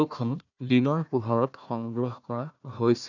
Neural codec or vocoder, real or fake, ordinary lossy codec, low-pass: codec, 16 kHz, 1 kbps, FunCodec, trained on Chinese and English, 50 frames a second; fake; none; 7.2 kHz